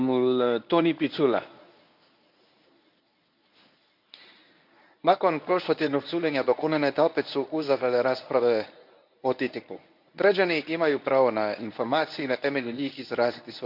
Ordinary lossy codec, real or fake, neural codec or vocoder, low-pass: none; fake; codec, 24 kHz, 0.9 kbps, WavTokenizer, medium speech release version 1; 5.4 kHz